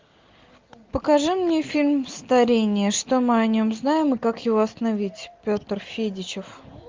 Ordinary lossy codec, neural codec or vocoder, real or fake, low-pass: Opus, 32 kbps; none; real; 7.2 kHz